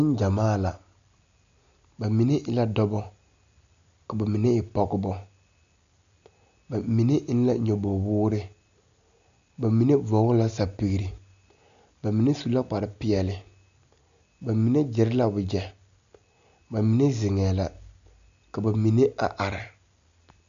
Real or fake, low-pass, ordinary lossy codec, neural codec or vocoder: real; 7.2 kHz; AAC, 96 kbps; none